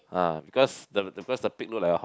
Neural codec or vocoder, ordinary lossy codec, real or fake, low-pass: none; none; real; none